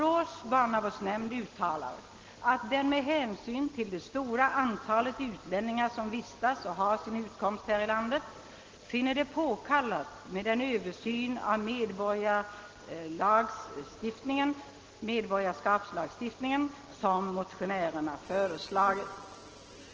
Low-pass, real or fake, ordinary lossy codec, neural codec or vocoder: 7.2 kHz; real; Opus, 16 kbps; none